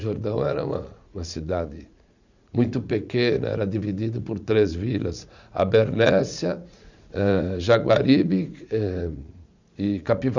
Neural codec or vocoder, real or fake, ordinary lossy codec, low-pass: vocoder, 44.1 kHz, 80 mel bands, Vocos; fake; none; 7.2 kHz